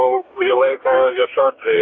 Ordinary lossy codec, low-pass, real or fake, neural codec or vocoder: AAC, 48 kbps; 7.2 kHz; fake; codec, 44.1 kHz, 2.6 kbps, DAC